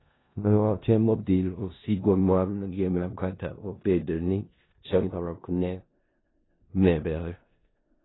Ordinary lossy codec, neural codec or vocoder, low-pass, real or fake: AAC, 16 kbps; codec, 16 kHz in and 24 kHz out, 0.4 kbps, LongCat-Audio-Codec, four codebook decoder; 7.2 kHz; fake